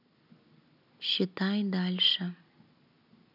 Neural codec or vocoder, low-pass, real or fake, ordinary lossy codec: none; 5.4 kHz; real; none